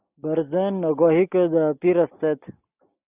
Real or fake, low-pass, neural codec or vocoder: real; 3.6 kHz; none